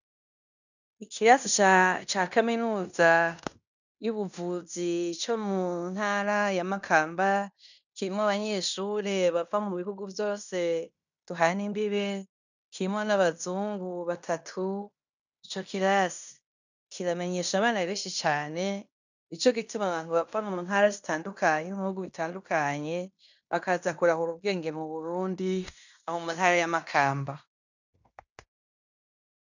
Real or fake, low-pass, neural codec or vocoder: fake; 7.2 kHz; codec, 16 kHz in and 24 kHz out, 0.9 kbps, LongCat-Audio-Codec, fine tuned four codebook decoder